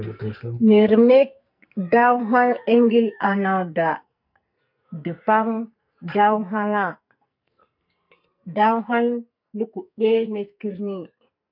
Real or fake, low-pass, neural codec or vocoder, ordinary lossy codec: fake; 5.4 kHz; codec, 44.1 kHz, 2.6 kbps, SNAC; MP3, 48 kbps